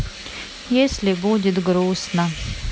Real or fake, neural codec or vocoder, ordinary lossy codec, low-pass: real; none; none; none